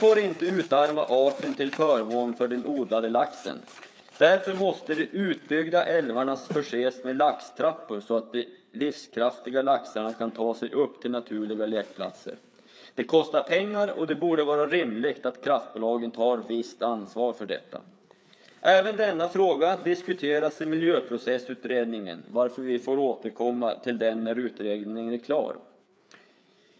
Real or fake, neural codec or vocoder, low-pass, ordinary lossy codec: fake; codec, 16 kHz, 4 kbps, FreqCodec, larger model; none; none